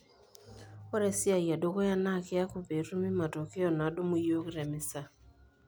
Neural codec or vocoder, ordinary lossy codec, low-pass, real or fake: none; none; none; real